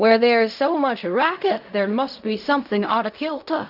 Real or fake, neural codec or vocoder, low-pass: fake; codec, 16 kHz in and 24 kHz out, 0.4 kbps, LongCat-Audio-Codec, fine tuned four codebook decoder; 5.4 kHz